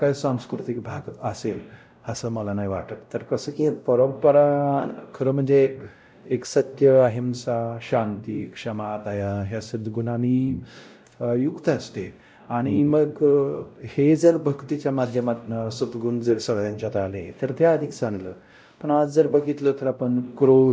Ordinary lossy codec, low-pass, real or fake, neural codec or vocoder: none; none; fake; codec, 16 kHz, 0.5 kbps, X-Codec, WavLM features, trained on Multilingual LibriSpeech